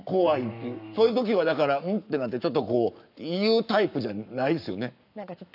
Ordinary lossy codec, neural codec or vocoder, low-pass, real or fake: none; codec, 44.1 kHz, 7.8 kbps, Pupu-Codec; 5.4 kHz; fake